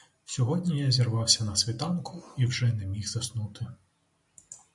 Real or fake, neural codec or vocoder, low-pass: real; none; 10.8 kHz